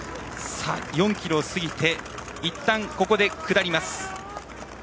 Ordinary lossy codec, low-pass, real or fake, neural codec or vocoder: none; none; real; none